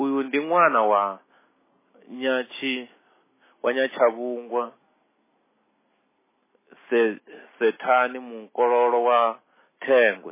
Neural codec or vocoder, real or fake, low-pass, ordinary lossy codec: none; real; 3.6 kHz; MP3, 16 kbps